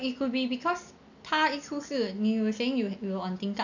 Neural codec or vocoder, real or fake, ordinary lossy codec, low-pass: none; real; none; 7.2 kHz